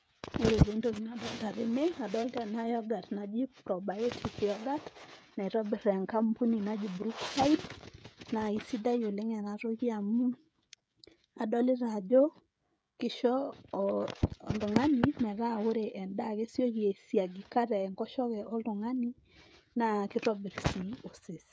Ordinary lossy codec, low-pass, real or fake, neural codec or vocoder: none; none; fake; codec, 16 kHz, 16 kbps, FreqCodec, smaller model